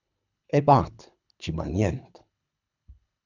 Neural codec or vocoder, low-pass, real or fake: codec, 24 kHz, 3 kbps, HILCodec; 7.2 kHz; fake